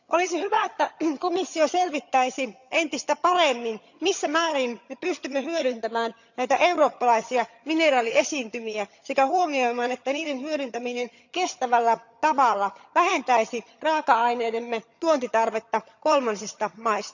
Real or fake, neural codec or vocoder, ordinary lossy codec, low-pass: fake; vocoder, 22.05 kHz, 80 mel bands, HiFi-GAN; none; 7.2 kHz